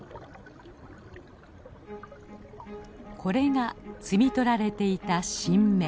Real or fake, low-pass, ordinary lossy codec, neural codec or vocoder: real; none; none; none